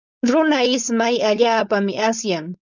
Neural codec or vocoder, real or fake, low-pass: codec, 16 kHz, 4.8 kbps, FACodec; fake; 7.2 kHz